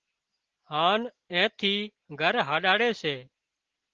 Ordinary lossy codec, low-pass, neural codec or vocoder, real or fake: Opus, 16 kbps; 7.2 kHz; none; real